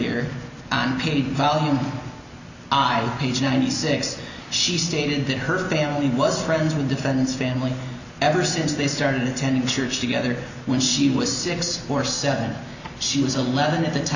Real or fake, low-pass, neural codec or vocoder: real; 7.2 kHz; none